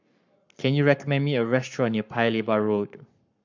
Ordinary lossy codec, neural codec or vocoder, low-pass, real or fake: none; codec, 44.1 kHz, 7.8 kbps, Pupu-Codec; 7.2 kHz; fake